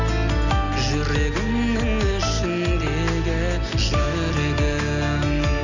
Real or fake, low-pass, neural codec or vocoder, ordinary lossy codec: real; 7.2 kHz; none; none